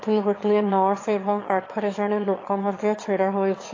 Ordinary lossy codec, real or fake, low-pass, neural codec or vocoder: AAC, 32 kbps; fake; 7.2 kHz; autoencoder, 22.05 kHz, a latent of 192 numbers a frame, VITS, trained on one speaker